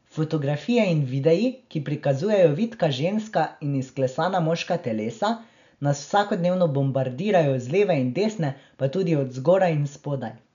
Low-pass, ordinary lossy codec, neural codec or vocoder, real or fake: 7.2 kHz; none; none; real